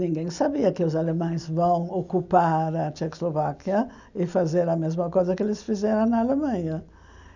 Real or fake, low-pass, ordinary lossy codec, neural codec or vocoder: real; 7.2 kHz; none; none